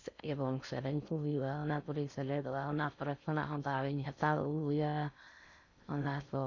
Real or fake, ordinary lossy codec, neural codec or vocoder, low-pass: fake; none; codec, 16 kHz in and 24 kHz out, 0.6 kbps, FocalCodec, streaming, 4096 codes; 7.2 kHz